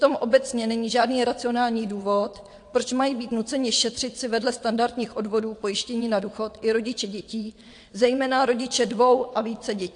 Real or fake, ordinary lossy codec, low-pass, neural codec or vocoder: fake; AAC, 64 kbps; 9.9 kHz; vocoder, 22.05 kHz, 80 mel bands, WaveNeXt